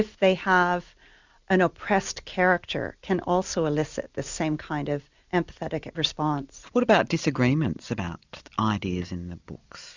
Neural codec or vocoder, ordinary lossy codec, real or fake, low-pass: none; Opus, 64 kbps; real; 7.2 kHz